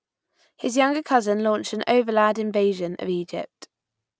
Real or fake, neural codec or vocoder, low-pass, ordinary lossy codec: real; none; none; none